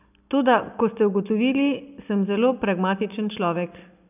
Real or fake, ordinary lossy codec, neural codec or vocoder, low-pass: real; none; none; 3.6 kHz